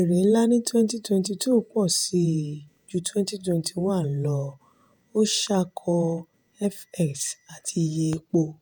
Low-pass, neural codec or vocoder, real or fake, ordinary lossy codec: none; vocoder, 48 kHz, 128 mel bands, Vocos; fake; none